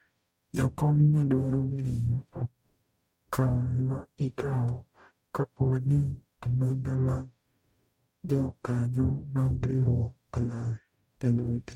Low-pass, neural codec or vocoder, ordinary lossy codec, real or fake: 19.8 kHz; codec, 44.1 kHz, 0.9 kbps, DAC; MP3, 64 kbps; fake